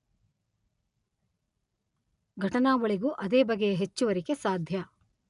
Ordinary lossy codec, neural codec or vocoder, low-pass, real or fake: none; none; 10.8 kHz; real